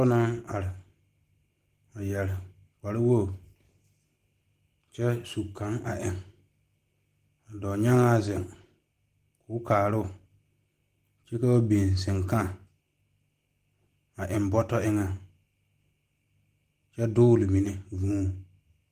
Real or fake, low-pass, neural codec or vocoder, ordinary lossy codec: real; 14.4 kHz; none; Opus, 24 kbps